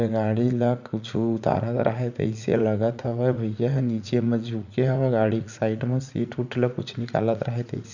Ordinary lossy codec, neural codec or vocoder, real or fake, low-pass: none; vocoder, 44.1 kHz, 80 mel bands, Vocos; fake; 7.2 kHz